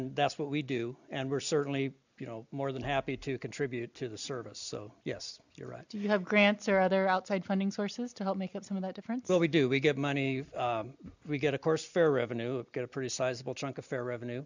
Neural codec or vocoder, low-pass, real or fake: none; 7.2 kHz; real